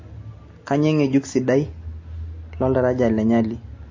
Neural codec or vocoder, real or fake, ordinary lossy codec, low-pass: none; real; MP3, 32 kbps; 7.2 kHz